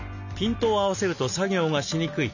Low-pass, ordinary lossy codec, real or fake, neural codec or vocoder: 7.2 kHz; none; real; none